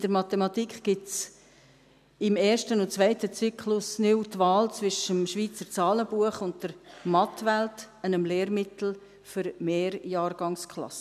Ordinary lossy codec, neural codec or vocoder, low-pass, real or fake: none; none; 14.4 kHz; real